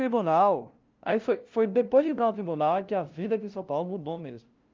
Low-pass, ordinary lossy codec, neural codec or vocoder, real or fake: 7.2 kHz; Opus, 24 kbps; codec, 16 kHz, 0.5 kbps, FunCodec, trained on LibriTTS, 25 frames a second; fake